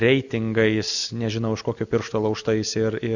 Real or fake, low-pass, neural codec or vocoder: real; 7.2 kHz; none